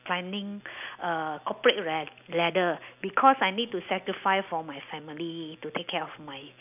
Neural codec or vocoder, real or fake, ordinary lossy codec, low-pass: none; real; none; 3.6 kHz